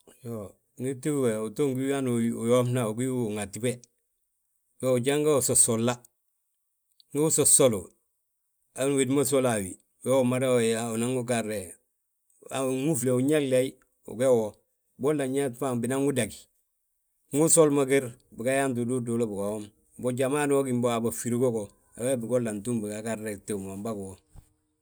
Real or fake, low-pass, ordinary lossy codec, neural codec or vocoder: real; none; none; none